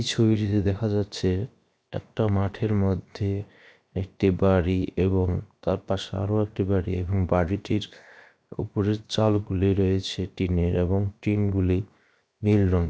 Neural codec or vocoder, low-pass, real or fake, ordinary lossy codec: codec, 16 kHz, 0.7 kbps, FocalCodec; none; fake; none